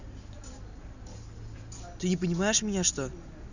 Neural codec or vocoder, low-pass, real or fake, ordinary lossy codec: none; 7.2 kHz; real; none